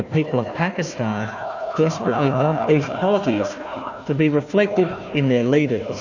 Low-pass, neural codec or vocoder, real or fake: 7.2 kHz; codec, 16 kHz, 1 kbps, FunCodec, trained on Chinese and English, 50 frames a second; fake